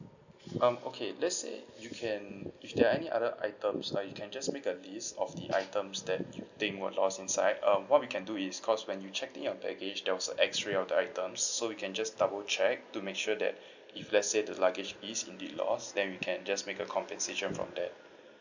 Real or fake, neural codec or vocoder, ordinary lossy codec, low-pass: real; none; none; 7.2 kHz